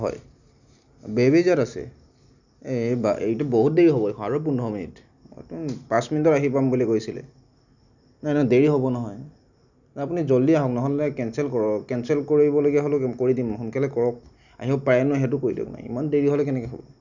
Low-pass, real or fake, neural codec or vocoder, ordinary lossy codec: 7.2 kHz; real; none; none